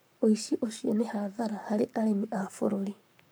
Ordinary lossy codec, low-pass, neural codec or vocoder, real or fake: none; none; codec, 44.1 kHz, 7.8 kbps, Pupu-Codec; fake